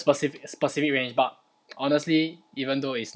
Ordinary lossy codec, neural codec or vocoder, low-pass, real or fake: none; none; none; real